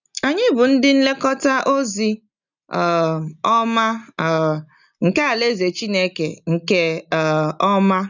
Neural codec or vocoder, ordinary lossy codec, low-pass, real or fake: none; none; 7.2 kHz; real